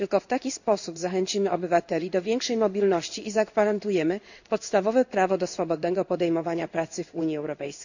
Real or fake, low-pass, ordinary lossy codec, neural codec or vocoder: fake; 7.2 kHz; none; codec, 16 kHz in and 24 kHz out, 1 kbps, XY-Tokenizer